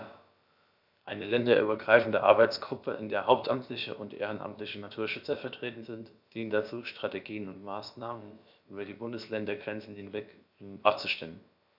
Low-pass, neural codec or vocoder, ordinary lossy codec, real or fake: 5.4 kHz; codec, 16 kHz, about 1 kbps, DyCAST, with the encoder's durations; none; fake